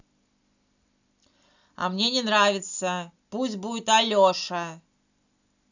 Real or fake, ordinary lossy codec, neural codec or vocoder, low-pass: real; none; none; 7.2 kHz